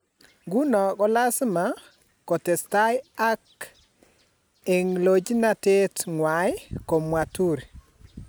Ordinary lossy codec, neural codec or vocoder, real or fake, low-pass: none; none; real; none